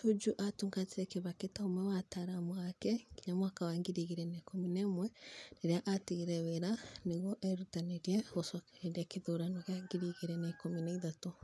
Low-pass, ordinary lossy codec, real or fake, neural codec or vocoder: none; none; real; none